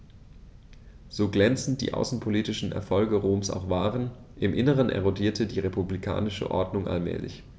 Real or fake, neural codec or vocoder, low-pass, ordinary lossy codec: real; none; none; none